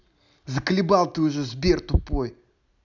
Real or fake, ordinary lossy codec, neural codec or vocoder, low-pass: real; none; none; 7.2 kHz